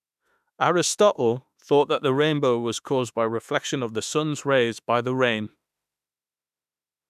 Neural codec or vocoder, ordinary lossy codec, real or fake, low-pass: autoencoder, 48 kHz, 32 numbers a frame, DAC-VAE, trained on Japanese speech; none; fake; 14.4 kHz